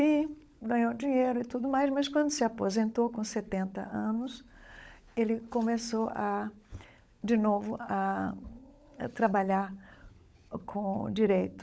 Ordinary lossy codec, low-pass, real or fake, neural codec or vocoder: none; none; fake; codec, 16 kHz, 16 kbps, FunCodec, trained on LibriTTS, 50 frames a second